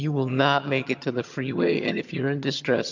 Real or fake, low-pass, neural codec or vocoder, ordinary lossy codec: fake; 7.2 kHz; vocoder, 22.05 kHz, 80 mel bands, HiFi-GAN; MP3, 64 kbps